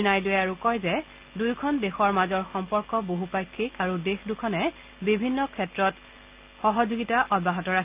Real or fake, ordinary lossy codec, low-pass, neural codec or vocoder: real; Opus, 24 kbps; 3.6 kHz; none